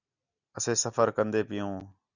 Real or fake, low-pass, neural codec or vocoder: real; 7.2 kHz; none